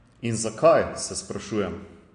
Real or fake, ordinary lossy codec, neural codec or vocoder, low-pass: real; MP3, 48 kbps; none; 9.9 kHz